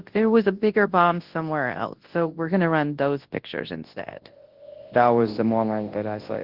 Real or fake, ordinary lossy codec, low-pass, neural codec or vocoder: fake; Opus, 16 kbps; 5.4 kHz; codec, 24 kHz, 0.9 kbps, WavTokenizer, large speech release